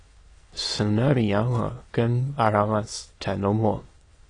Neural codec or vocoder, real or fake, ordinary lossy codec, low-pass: autoencoder, 22.05 kHz, a latent of 192 numbers a frame, VITS, trained on many speakers; fake; AAC, 32 kbps; 9.9 kHz